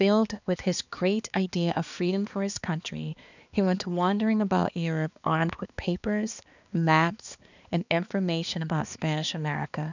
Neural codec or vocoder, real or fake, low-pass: codec, 16 kHz, 2 kbps, X-Codec, HuBERT features, trained on balanced general audio; fake; 7.2 kHz